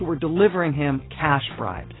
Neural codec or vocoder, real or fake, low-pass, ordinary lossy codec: none; real; 7.2 kHz; AAC, 16 kbps